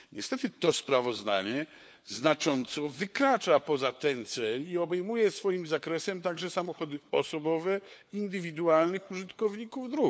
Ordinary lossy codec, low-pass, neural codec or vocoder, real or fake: none; none; codec, 16 kHz, 4 kbps, FunCodec, trained on LibriTTS, 50 frames a second; fake